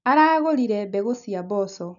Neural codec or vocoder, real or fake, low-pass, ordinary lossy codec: none; real; 7.2 kHz; none